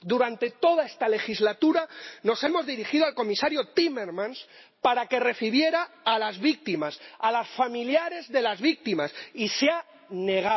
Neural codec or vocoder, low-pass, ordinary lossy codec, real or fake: none; 7.2 kHz; MP3, 24 kbps; real